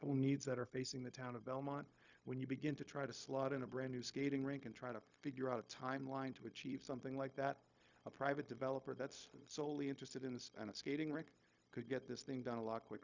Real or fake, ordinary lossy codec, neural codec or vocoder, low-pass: fake; Opus, 64 kbps; codec, 16 kHz, 0.4 kbps, LongCat-Audio-Codec; 7.2 kHz